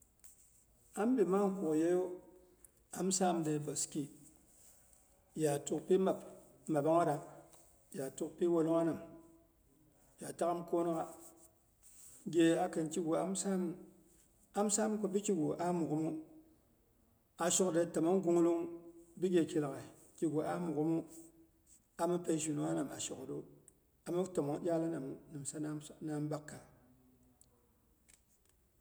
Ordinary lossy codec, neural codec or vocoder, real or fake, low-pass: none; none; real; none